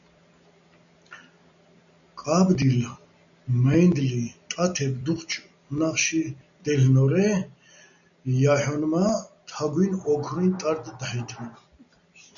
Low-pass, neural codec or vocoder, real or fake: 7.2 kHz; none; real